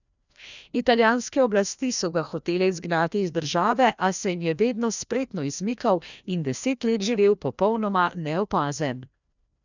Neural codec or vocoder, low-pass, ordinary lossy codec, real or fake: codec, 16 kHz, 1 kbps, FreqCodec, larger model; 7.2 kHz; none; fake